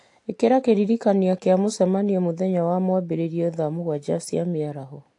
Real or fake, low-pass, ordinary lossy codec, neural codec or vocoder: real; 10.8 kHz; AAC, 48 kbps; none